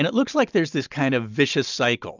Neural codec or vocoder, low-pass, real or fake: none; 7.2 kHz; real